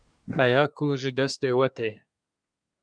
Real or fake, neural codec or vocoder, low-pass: fake; codec, 24 kHz, 1 kbps, SNAC; 9.9 kHz